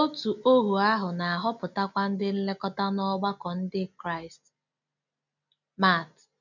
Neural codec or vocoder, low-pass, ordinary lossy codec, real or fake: none; 7.2 kHz; none; real